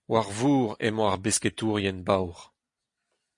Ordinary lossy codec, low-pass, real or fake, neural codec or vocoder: MP3, 48 kbps; 10.8 kHz; real; none